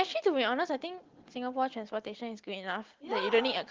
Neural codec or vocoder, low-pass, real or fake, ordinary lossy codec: none; 7.2 kHz; real; Opus, 16 kbps